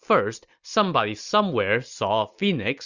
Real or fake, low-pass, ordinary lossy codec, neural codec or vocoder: real; 7.2 kHz; Opus, 64 kbps; none